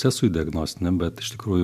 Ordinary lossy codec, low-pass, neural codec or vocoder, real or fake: MP3, 96 kbps; 14.4 kHz; none; real